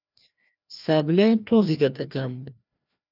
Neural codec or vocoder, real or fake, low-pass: codec, 16 kHz, 1 kbps, FreqCodec, larger model; fake; 5.4 kHz